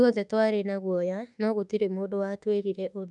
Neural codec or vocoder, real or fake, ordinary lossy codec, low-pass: autoencoder, 48 kHz, 32 numbers a frame, DAC-VAE, trained on Japanese speech; fake; none; 10.8 kHz